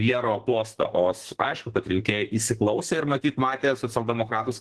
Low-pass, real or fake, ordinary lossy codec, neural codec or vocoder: 10.8 kHz; fake; Opus, 16 kbps; codec, 32 kHz, 1.9 kbps, SNAC